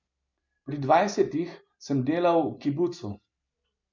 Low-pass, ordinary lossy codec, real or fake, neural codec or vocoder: 7.2 kHz; MP3, 64 kbps; real; none